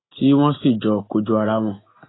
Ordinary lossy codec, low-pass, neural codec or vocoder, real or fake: AAC, 16 kbps; 7.2 kHz; none; real